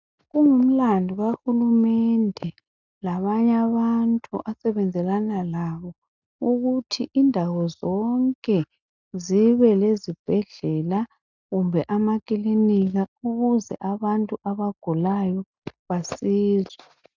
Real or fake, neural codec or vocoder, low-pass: real; none; 7.2 kHz